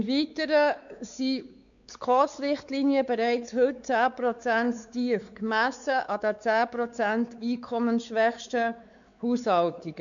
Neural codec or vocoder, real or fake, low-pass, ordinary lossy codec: codec, 16 kHz, 4 kbps, X-Codec, WavLM features, trained on Multilingual LibriSpeech; fake; 7.2 kHz; none